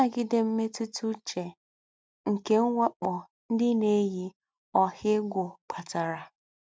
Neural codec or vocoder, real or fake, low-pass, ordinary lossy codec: none; real; none; none